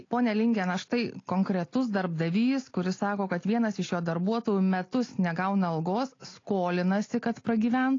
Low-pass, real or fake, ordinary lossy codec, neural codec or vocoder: 7.2 kHz; real; AAC, 32 kbps; none